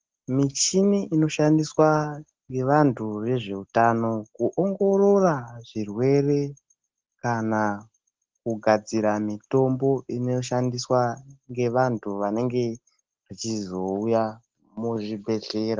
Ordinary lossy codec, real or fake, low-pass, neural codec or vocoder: Opus, 16 kbps; real; 7.2 kHz; none